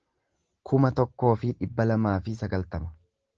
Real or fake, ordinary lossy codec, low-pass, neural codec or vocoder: real; Opus, 16 kbps; 7.2 kHz; none